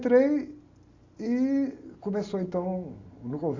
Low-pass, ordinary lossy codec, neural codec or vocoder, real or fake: 7.2 kHz; Opus, 64 kbps; none; real